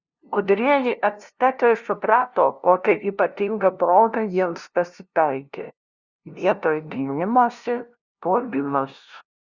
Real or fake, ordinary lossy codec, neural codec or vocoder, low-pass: fake; Opus, 64 kbps; codec, 16 kHz, 0.5 kbps, FunCodec, trained on LibriTTS, 25 frames a second; 7.2 kHz